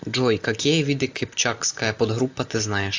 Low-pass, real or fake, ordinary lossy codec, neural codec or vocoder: 7.2 kHz; real; AAC, 48 kbps; none